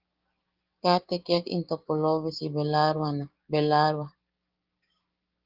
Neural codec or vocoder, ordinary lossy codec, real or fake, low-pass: codec, 16 kHz, 6 kbps, DAC; Opus, 24 kbps; fake; 5.4 kHz